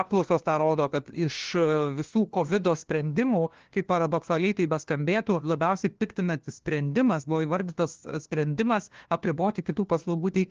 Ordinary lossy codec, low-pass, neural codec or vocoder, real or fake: Opus, 16 kbps; 7.2 kHz; codec, 16 kHz, 1 kbps, FunCodec, trained on LibriTTS, 50 frames a second; fake